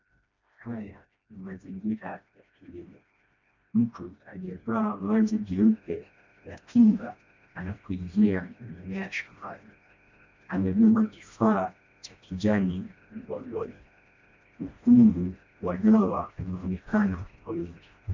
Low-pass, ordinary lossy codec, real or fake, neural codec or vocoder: 7.2 kHz; MP3, 48 kbps; fake; codec, 16 kHz, 1 kbps, FreqCodec, smaller model